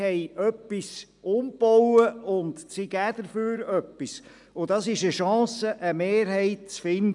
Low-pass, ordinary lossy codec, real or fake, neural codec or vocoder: 10.8 kHz; none; real; none